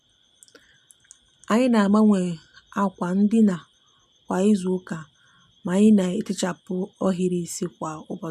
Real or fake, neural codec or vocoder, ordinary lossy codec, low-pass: real; none; MP3, 96 kbps; 14.4 kHz